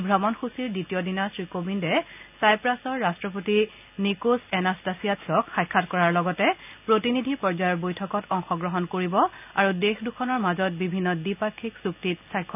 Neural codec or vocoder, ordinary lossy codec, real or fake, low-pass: none; none; real; 3.6 kHz